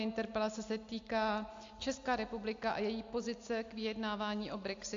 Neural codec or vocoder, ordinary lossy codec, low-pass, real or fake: none; AAC, 48 kbps; 7.2 kHz; real